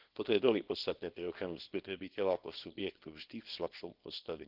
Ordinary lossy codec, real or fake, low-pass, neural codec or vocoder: Opus, 16 kbps; fake; 5.4 kHz; codec, 24 kHz, 0.9 kbps, WavTokenizer, small release